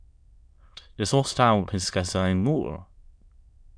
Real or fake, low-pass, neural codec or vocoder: fake; 9.9 kHz; autoencoder, 22.05 kHz, a latent of 192 numbers a frame, VITS, trained on many speakers